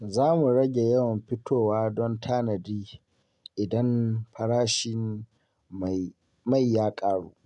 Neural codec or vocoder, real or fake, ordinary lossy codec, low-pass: none; real; MP3, 96 kbps; 10.8 kHz